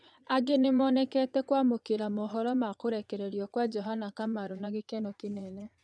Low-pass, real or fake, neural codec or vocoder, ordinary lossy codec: none; fake; vocoder, 22.05 kHz, 80 mel bands, Vocos; none